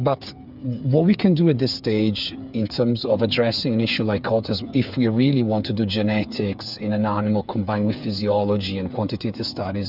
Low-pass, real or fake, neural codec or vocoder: 5.4 kHz; fake; codec, 16 kHz, 8 kbps, FreqCodec, smaller model